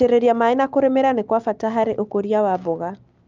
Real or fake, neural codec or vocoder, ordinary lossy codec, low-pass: real; none; Opus, 24 kbps; 7.2 kHz